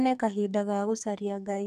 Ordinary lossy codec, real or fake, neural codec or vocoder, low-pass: none; fake; codec, 44.1 kHz, 2.6 kbps, SNAC; 14.4 kHz